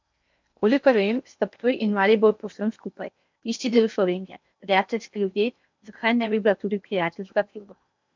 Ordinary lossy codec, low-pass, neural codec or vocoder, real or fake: MP3, 64 kbps; 7.2 kHz; codec, 16 kHz in and 24 kHz out, 0.8 kbps, FocalCodec, streaming, 65536 codes; fake